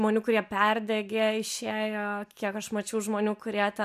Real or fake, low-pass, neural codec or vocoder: real; 14.4 kHz; none